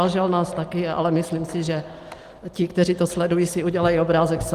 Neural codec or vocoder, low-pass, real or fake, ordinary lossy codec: none; 14.4 kHz; real; Opus, 24 kbps